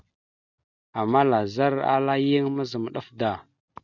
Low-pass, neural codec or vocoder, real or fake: 7.2 kHz; none; real